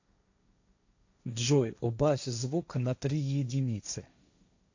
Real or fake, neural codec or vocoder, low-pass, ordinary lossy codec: fake; codec, 16 kHz, 1.1 kbps, Voila-Tokenizer; 7.2 kHz; AAC, 48 kbps